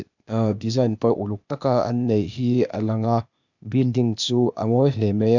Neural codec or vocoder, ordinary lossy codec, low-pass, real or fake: codec, 16 kHz, 0.8 kbps, ZipCodec; none; 7.2 kHz; fake